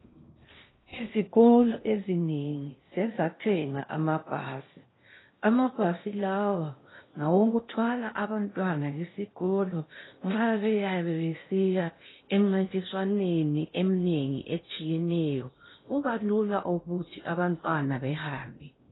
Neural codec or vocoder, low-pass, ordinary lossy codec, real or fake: codec, 16 kHz in and 24 kHz out, 0.6 kbps, FocalCodec, streaming, 2048 codes; 7.2 kHz; AAC, 16 kbps; fake